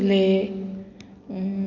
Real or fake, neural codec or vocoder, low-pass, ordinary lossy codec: real; none; 7.2 kHz; none